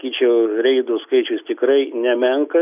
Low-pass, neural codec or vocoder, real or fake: 3.6 kHz; none; real